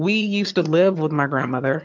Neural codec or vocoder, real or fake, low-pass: vocoder, 22.05 kHz, 80 mel bands, HiFi-GAN; fake; 7.2 kHz